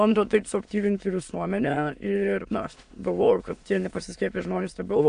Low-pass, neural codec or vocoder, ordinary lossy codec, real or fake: 9.9 kHz; autoencoder, 22.05 kHz, a latent of 192 numbers a frame, VITS, trained on many speakers; AAC, 64 kbps; fake